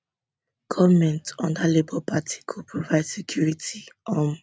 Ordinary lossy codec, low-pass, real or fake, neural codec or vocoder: none; none; real; none